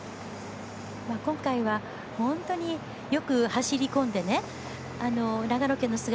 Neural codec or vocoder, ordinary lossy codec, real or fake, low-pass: none; none; real; none